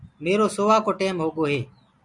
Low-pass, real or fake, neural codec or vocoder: 10.8 kHz; real; none